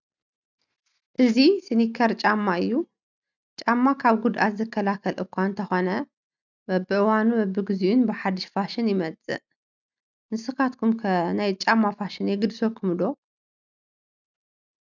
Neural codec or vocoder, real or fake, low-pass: none; real; 7.2 kHz